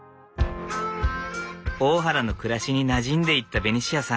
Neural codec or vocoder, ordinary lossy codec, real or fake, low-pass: none; none; real; none